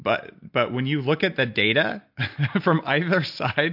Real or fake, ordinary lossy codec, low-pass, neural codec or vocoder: real; MP3, 48 kbps; 5.4 kHz; none